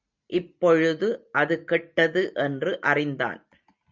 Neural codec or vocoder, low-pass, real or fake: none; 7.2 kHz; real